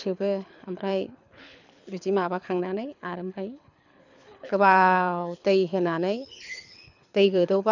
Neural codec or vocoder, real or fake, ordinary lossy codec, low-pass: codec, 24 kHz, 6 kbps, HILCodec; fake; none; 7.2 kHz